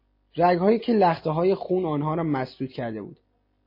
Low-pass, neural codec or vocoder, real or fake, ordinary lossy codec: 5.4 kHz; none; real; MP3, 24 kbps